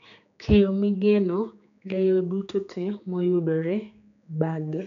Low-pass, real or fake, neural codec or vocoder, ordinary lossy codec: 7.2 kHz; fake; codec, 16 kHz, 4 kbps, X-Codec, HuBERT features, trained on general audio; none